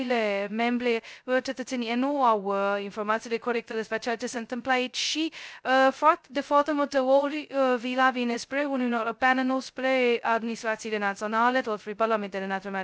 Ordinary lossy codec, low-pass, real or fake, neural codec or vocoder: none; none; fake; codec, 16 kHz, 0.2 kbps, FocalCodec